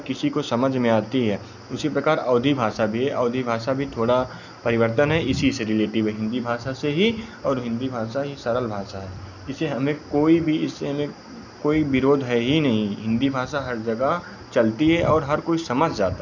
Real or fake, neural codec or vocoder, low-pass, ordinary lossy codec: real; none; 7.2 kHz; none